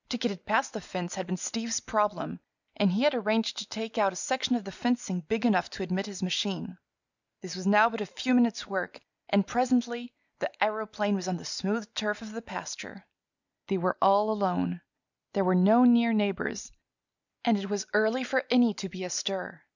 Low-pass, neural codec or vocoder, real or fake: 7.2 kHz; none; real